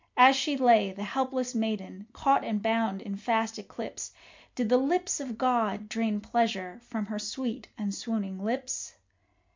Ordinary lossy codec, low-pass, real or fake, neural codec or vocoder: MP3, 64 kbps; 7.2 kHz; real; none